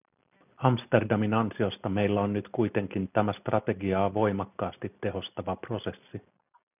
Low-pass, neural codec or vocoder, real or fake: 3.6 kHz; none; real